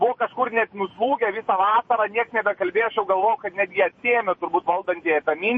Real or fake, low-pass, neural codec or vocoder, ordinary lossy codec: fake; 9.9 kHz; vocoder, 48 kHz, 128 mel bands, Vocos; MP3, 32 kbps